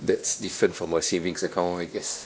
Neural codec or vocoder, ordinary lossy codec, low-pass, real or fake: codec, 16 kHz, 1 kbps, X-Codec, WavLM features, trained on Multilingual LibriSpeech; none; none; fake